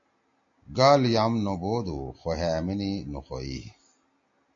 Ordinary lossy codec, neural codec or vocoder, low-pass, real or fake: AAC, 48 kbps; none; 7.2 kHz; real